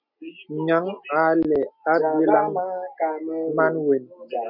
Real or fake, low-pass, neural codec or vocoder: real; 5.4 kHz; none